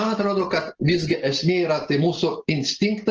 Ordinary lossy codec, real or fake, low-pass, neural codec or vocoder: Opus, 16 kbps; real; 7.2 kHz; none